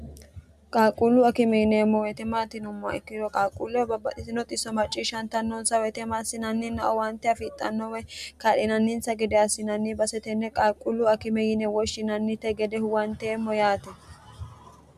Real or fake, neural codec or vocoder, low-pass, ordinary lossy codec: real; none; 14.4 kHz; MP3, 96 kbps